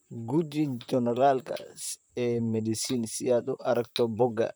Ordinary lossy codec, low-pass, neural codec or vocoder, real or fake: none; none; vocoder, 44.1 kHz, 128 mel bands, Pupu-Vocoder; fake